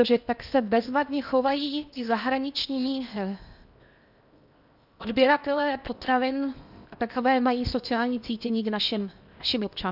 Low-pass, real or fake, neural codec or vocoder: 5.4 kHz; fake; codec, 16 kHz in and 24 kHz out, 0.8 kbps, FocalCodec, streaming, 65536 codes